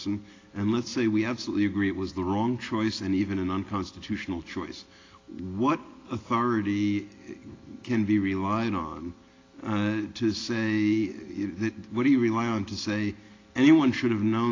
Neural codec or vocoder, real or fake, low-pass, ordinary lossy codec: none; real; 7.2 kHz; AAC, 32 kbps